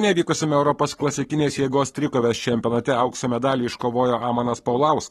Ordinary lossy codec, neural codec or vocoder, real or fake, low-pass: AAC, 32 kbps; codec, 44.1 kHz, 7.8 kbps, Pupu-Codec; fake; 19.8 kHz